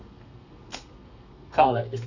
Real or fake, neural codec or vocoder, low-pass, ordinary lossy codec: fake; codec, 32 kHz, 1.9 kbps, SNAC; 7.2 kHz; none